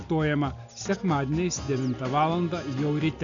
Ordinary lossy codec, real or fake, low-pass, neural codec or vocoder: AAC, 64 kbps; real; 7.2 kHz; none